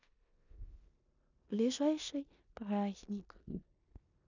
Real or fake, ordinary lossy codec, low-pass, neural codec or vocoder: fake; none; 7.2 kHz; codec, 16 kHz in and 24 kHz out, 0.9 kbps, LongCat-Audio-Codec, four codebook decoder